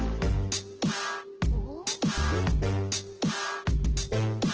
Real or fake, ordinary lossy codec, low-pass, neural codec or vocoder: real; Opus, 16 kbps; 7.2 kHz; none